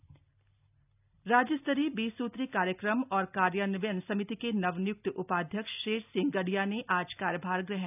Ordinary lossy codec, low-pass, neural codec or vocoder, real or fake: none; 3.6 kHz; none; real